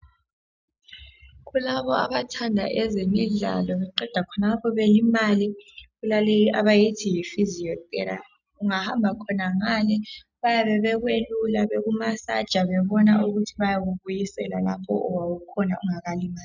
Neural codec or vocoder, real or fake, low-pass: none; real; 7.2 kHz